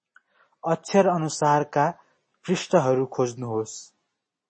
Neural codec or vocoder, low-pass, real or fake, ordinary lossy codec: none; 9.9 kHz; real; MP3, 32 kbps